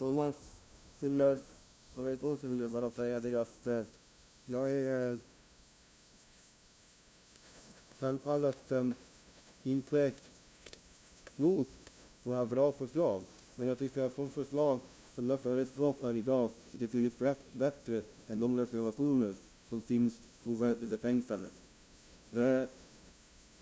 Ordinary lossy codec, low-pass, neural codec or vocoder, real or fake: none; none; codec, 16 kHz, 0.5 kbps, FunCodec, trained on LibriTTS, 25 frames a second; fake